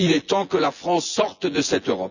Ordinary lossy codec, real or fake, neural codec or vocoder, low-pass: none; fake; vocoder, 24 kHz, 100 mel bands, Vocos; 7.2 kHz